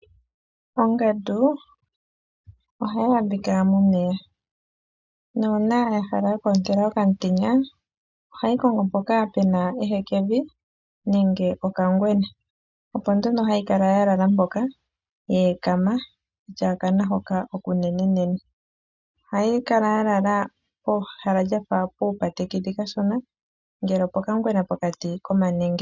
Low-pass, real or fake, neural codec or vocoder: 7.2 kHz; real; none